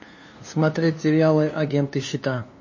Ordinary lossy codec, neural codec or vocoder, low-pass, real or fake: MP3, 32 kbps; codec, 16 kHz, 2 kbps, FunCodec, trained on LibriTTS, 25 frames a second; 7.2 kHz; fake